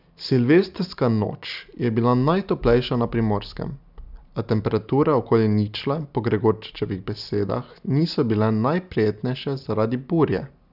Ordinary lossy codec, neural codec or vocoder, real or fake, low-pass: none; none; real; 5.4 kHz